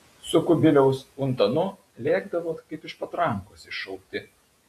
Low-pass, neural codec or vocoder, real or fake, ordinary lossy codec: 14.4 kHz; vocoder, 48 kHz, 128 mel bands, Vocos; fake; AAC, 64 kbps